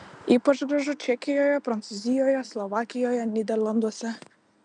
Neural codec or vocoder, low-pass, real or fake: none; 9.9 kHz; real